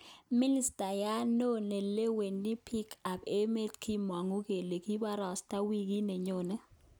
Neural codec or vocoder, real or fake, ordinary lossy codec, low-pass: none; real; none; none